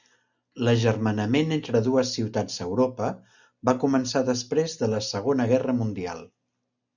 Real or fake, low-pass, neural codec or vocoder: real; 7.2 kHz; none